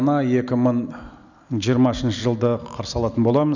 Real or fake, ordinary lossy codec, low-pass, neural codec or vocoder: real; none; 7.2 kHz; none